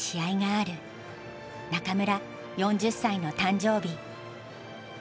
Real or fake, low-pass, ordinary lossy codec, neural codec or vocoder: real; none; none; none